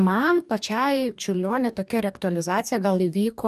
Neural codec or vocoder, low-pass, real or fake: codec, 44.1 kHz, 2.6 kbps, DAC; 14.4 kHz; fake